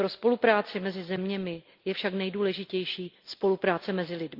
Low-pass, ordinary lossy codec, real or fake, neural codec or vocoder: 5.4 kHz; Opus, 32 kbps; real; none